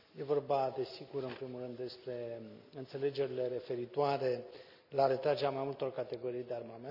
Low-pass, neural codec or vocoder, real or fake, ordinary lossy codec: 5.4 kHz; none; real; none